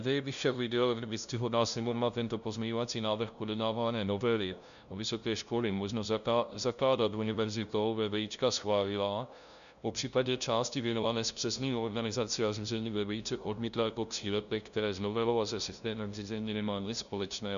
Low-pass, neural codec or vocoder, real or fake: 7.2 kHz; codec, 16 kHz, 0.5 kbps, FunCodec, trained on LibriTTS, 25 frames a second; fake